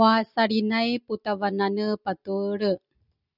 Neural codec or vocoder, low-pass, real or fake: none; 5.4 kHz; real